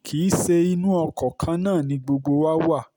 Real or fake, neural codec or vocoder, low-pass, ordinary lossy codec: real; none; none; none